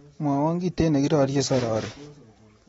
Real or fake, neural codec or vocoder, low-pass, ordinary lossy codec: real; none; 19.8 kHz; AAC, 24 kbps